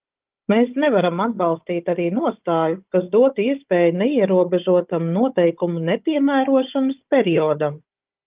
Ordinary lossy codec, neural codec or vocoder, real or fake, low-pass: Opus, 32 kbps; codec, 16 kHz, 16 kbps, FunCodec, trained on Chinese and English, 50 frames a second; fake; 3.6 kHz